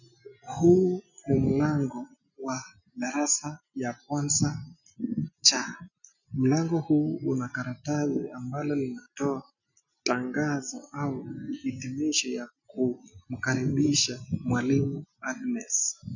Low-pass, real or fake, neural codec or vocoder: 7.2 kHz; real; none